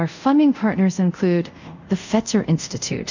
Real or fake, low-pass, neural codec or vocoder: fake; 7.2 kHz; codec, 24 kHz, 0.5 kbps, DualCodec